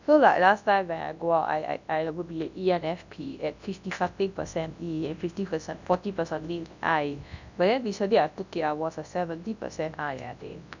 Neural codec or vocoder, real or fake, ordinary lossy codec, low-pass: codec, 24 kHz, 0.9 kbps, WavTokenizer, large speech release; fake; none; 7.2 kHz